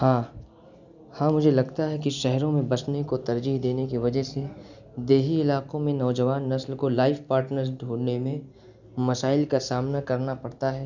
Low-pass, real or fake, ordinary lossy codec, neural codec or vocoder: 7.2 kHz; real; none; none